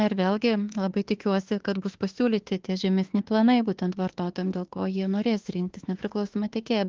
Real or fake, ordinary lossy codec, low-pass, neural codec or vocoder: fake; Opus, 16 kbps; 7.2 kHz; autoencoder, 48 kHz, 32 numbers a frame, DAC-VAE, trained on Japanese speech